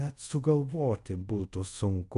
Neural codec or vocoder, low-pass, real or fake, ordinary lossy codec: codec, 24 kHz, 0.5 kbps, DualCodec; 10.8 kHz; fake; MP3, 64 kbps